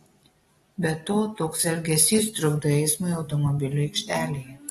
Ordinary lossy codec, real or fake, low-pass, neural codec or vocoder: AAC, 32 kbps; real; 19.8 kHz; none